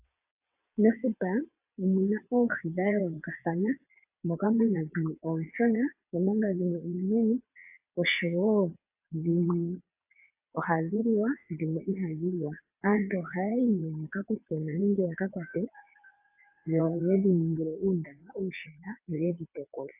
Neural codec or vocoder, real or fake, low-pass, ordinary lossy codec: vocoder, 22.05 kHz, 80 mel bands, WaveNeXt; fake; 3.6 kHz; AAC, 32 kbps